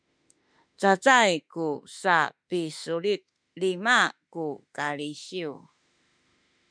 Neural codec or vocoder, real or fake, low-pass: autoencoder, 48 kHz, 32 numbers a frame, DAC-VAE, trained on Japanese speech; fake; 9.9 kHz